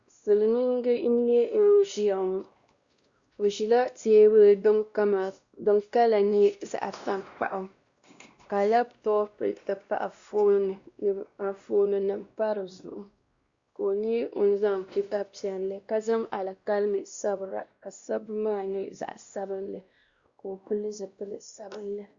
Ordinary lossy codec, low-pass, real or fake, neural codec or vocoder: Opus, 64 kbps; 7.2 kHz; fake; codec, 16 kHz, 1 kbps, X-Codec, WavLM features, trained on Multilingual LibriSpeech